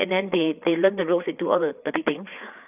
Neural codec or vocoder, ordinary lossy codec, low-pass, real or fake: codec, 16 kHz, 4 kbps, FreqCodec, larger model; none; 3.6 kHz; fake